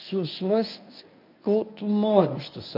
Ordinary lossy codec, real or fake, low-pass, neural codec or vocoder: MP3, 48 kbps; fake; 5.4 kHz; codec, 24 kHz, 0.9 kbps, WavTokenizer, medium speech release version 1